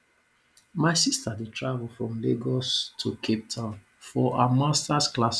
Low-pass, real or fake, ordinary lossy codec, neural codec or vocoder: none; real; none; none